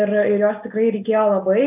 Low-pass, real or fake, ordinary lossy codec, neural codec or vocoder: 3.6 kHz; real; AAC, 32 kbps; none